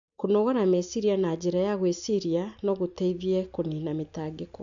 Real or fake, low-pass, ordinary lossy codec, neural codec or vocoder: real; 7.2 kHz; none; none